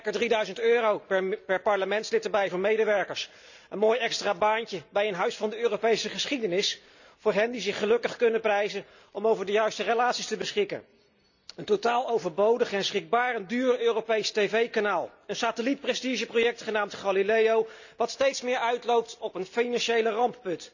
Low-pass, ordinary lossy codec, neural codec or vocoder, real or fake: 7.2 kHz; none; none; real